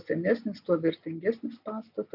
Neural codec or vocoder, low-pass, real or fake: none; 5.4 kHz; real